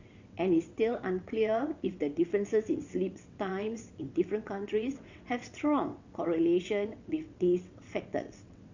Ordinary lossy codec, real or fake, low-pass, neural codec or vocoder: Opus, 64 kbps; fake; 7.2 kHz; vocoder, 22.05 kHz, 80 mel bands, WaveNeXt